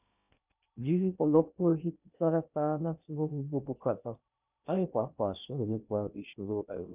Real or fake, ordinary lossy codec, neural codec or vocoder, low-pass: fake; none; codec, 16 kHz in and 24 kHz out, 0.6 kbps, FocalCodec, streaming, 2048 codes; 3.6 kHz